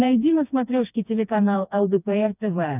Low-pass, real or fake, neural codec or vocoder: 3.6 kHz; fake; codec, 16 kHz, 2 kbps, FreqCodec, smaller model